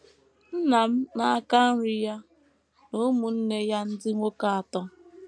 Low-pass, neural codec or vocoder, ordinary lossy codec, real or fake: none; none; none; real